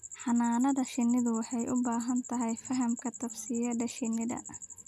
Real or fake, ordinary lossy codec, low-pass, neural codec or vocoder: real; none; 14.4 kHz; none